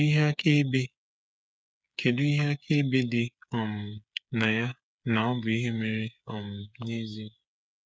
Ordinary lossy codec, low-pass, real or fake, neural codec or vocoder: none; none; fake; codec, 16 kHz, 8 kbps, FreqCodec, smaller model